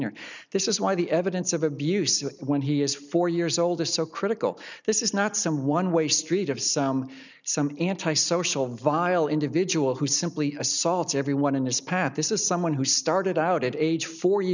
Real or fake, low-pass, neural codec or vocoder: real; 7.2 kHz; none